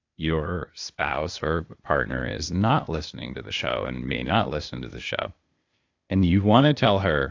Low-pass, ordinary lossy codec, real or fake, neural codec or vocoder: 7.2 kHz; AAC, 48 kbps; fake; codec, 16 kHz, 0.8 kbps, ZipCodec